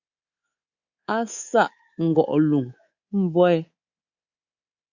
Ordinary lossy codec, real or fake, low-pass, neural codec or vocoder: Opus, 64 kbps; fake; 7.2 kHz; codec, 24 kHz, 3.1 kbps, DualCodec